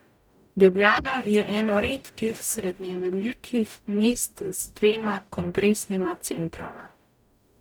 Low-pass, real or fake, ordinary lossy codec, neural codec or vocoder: none; fake; none; codec, 44.1 kHz, 0.9 kbps, DAC